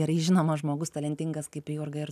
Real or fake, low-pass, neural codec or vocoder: real; 14.4 kHz; none